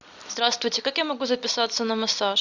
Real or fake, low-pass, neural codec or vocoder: real; 7.2 kHz; none